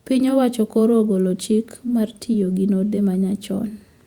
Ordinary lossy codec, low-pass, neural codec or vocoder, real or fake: Opus, 64 kbps; 19.8 kHz; vocoder, 48 kHz, 128 mel bands, Vocos; fake